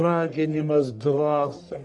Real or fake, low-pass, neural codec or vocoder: fake; 10.8 kHz; codec, 44.1 kHz, 1.7 kbps, Pupu-Codec